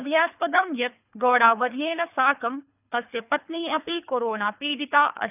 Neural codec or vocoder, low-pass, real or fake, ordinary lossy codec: codec, 24 kHz, 3 kbps, HILCodec; 3.6 kHz; fake; none